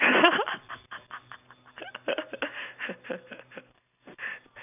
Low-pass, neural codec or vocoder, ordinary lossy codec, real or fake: 3.6 kHz; none; none; real